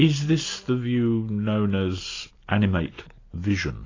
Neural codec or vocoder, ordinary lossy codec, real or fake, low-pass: none; AAC, 32 kbps; real; 7.2 kHz